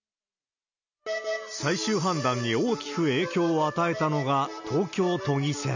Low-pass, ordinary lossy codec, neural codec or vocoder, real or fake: 7.2 kHz; none; none; real